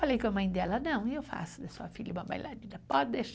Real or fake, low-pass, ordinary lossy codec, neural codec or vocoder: real; none; none; none